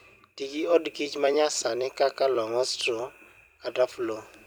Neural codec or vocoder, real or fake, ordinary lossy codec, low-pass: vocoder, 44.1 kHz, 128 mel bands every 256 samples, BigVGAN v2; fake; none; none